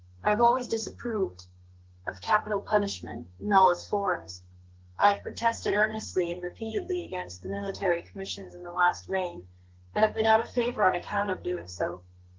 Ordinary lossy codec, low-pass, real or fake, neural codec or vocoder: Opus, 16 kbps; 7.2 kHz; fake; codec, 32 kHz, 1.9 kbps, SNAC